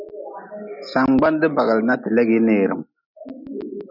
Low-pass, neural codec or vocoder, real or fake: 5.4 kHz; none; real